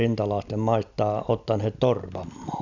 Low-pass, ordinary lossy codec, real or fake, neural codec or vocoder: 7.2 kHz; none; real; none